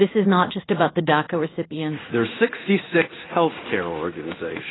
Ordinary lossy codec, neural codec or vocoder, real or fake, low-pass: AAC, 16 kbps; codec, 16 kHz in and 24 kHz out, 1 kbps, XY-Tokenizer; fake; 7.2 kHz